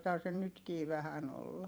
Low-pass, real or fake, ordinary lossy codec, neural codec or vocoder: none; fake; none; vocoder, 44.1 kHz, 128 mel bands every 512 samples, BigVGAN v2